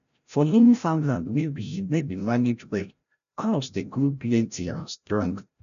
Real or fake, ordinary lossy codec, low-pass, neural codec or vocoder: fake; none; 7.2 kHz; codec, 16 kHz, 0.5 kbps, FreqCodec, larger model